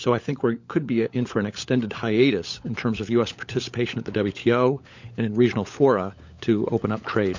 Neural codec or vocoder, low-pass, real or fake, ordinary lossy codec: codec, 16 kHz, 16 kbps, FunCodec, trained on LibriTTS, 50 frames a second; 7.2 kHz; fake; MP3, 48 kbps